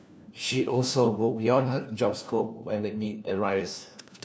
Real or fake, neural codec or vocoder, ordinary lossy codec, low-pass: fake; codec, 16 kHz, 1 kbps, FunCodec, trained on LibriTTS, 50 frames a second; none; none